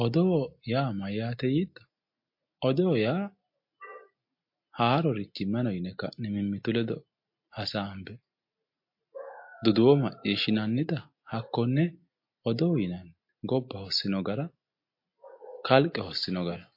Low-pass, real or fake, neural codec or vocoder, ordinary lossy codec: 5.4 kHz; real; none; MP3, 32 kbps